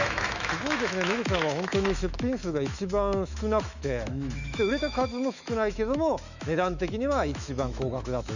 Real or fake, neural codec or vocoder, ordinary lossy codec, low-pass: fake; autoencoder, 48 kHz, 128 numbers a frame, DAC-VAE, trained on Japanese speech; none; 7.2 kHz